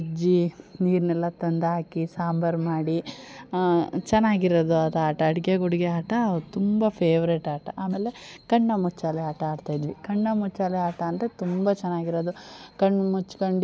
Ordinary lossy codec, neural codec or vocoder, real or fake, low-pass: none; none; real; none